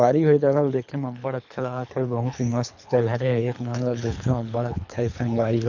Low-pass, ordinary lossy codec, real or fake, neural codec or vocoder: 7.2 kHz; none; fake; codec, 24 kHz, 3 kbps, HILCodec